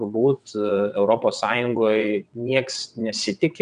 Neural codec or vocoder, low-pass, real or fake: vocoder, 22.05 kHz, 80 mel bands, WaveNeXt; 9.9 kHz; fake